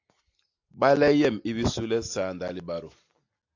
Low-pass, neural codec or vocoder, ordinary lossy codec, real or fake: 7.2 kHz; none; AAC, 48 kbps; real